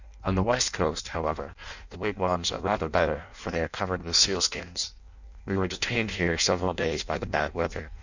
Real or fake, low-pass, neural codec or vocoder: fake; 7.2 kHz; codec, 16 kHz in and 24 kHz out, 0.6 kbps, FireRedTTS-2 codec